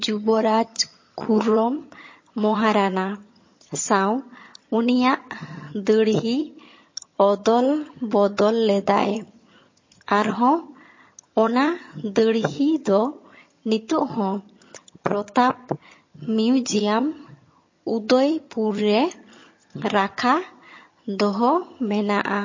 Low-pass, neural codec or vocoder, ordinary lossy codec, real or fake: 7.2 kHz; vocoder, 22.05 kHz, 80 mel bands, HiFi-GAN; MP3, 32 kbps; fake